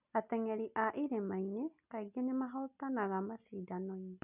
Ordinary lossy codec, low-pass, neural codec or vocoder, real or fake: none; 3.6 kHz; none; real